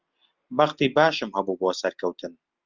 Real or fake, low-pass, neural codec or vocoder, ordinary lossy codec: real; 7.2 kHz; none; Opus, 24 kbps